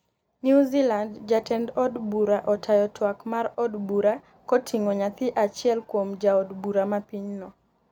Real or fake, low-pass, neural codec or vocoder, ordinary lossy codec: real; 19.8 kHz; none; none